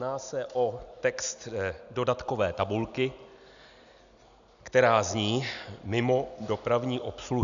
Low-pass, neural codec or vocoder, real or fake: 7.2 kHz; none; real